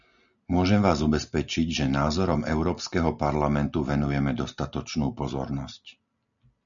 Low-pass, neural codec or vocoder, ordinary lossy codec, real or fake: 7.2 kHz; none; MP3, 96 kbps; real